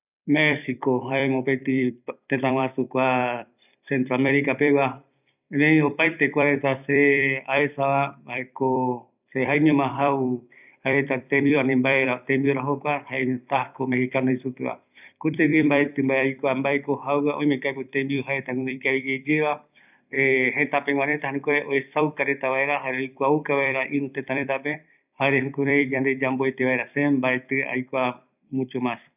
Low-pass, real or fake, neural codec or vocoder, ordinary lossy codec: 3.6 kHz; fake; vocoder, 44.1 kHz, 128 mel bands every 256 samples, BigVGAN v2; none